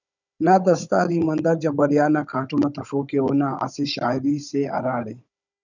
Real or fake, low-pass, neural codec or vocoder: fake; 7.2 kHz; codec, 16 kHz, 16 kbps, FunCodec, trained on Chinese and English, 50 frames a second